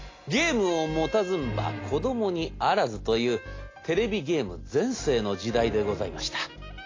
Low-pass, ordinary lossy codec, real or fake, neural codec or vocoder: 7.2 kHz; AAC, 32 kbps; real; none